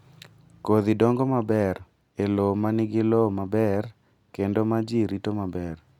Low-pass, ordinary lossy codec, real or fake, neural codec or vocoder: 19.8 kHz; none; real; none